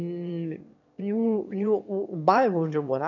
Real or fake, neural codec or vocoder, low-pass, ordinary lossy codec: fake; autoencoder, 22.05 kHz, a latent of 192 numbers a frame, VITS, trained on one speaker; 7.2 kHz; none